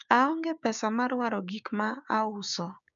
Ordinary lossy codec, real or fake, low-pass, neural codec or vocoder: none; fake; 7.2 kHz; codec, 16 kHz, 6 kbps, DAC